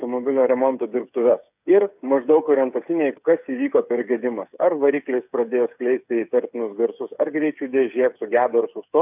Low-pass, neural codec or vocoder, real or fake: 3.6 kHz; codec, 16 kHz, 8 kbps, FreqCodec, smaller model; fake